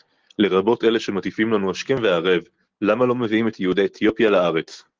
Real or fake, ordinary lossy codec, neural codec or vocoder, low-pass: real; Opus, 16 kbps; none; 7.2 kHz